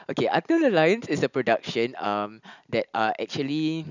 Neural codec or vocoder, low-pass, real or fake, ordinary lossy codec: none; 7.2 kHz; real; none